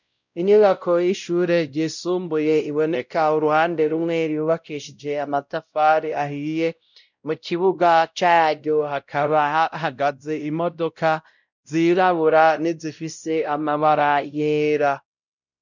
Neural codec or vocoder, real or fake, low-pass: codec, 16 kHz, 0.5 kbps, X-Codec, WavLM features, trained on Multilingual LibriSpeech; fake; 7.2 kHz